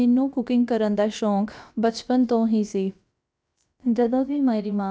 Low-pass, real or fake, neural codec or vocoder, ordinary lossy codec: none; fake; codec, 16 kHz, about 1 kbps, DyCAST, with the encoder's durations; none